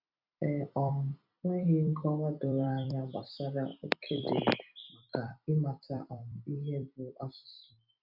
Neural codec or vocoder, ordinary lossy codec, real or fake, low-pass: vocoder, 44.1 kHz, 128 mel bands every 256 samples, BigVGAN v2; none; fake; 5.4 kHz